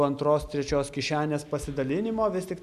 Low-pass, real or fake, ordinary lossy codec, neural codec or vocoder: 14.4 kHz; real; MP3, 96 kbps; none